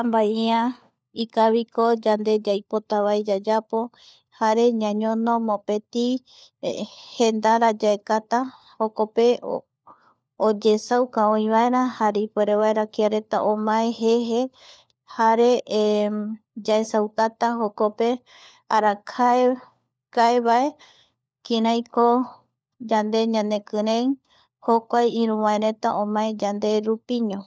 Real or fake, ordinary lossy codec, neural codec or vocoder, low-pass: fake; none; codec, 16 kHz, 4 kbps, FunCodec, trained on LibriTTS, 50 frames a second; none